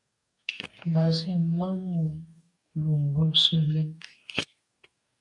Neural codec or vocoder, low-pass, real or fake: codec, 44.1 kHz, 2.6 kbps, DAC; 10.8 kHz; fake